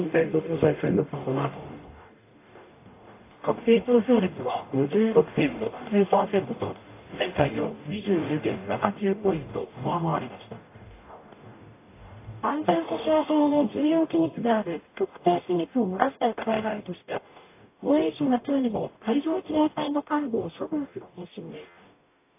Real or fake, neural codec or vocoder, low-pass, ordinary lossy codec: fake; codec, 44.1 kHz, 0.9 kbps, DAC; 3.6 kHz; AAC, 24 kbps